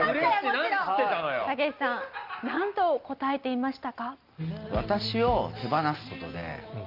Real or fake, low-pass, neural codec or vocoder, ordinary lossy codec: real; 5.4 kHz; none; Opus, 24 kbps